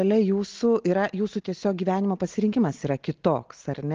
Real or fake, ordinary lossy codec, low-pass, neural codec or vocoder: real; Opus, 16 kbps; 7.2 kHz; none